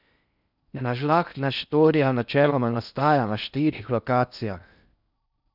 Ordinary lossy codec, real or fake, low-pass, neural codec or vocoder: none; fake; 5.4 kHz; codec, 16 kHz in and 24 kHz out, 0.6 kbps, FocalCodec, streaming, 4096 codes